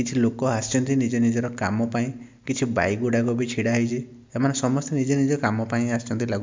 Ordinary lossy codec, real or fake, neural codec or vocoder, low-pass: MP3, 64 kbps; real; none; 7.2 kHz